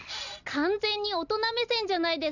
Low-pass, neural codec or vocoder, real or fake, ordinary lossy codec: 7.2 kHz; none; real; none